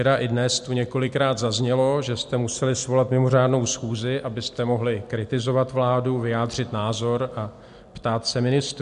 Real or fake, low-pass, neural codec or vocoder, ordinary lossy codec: real; 10.8 kHz; none; MP3, 64 kbps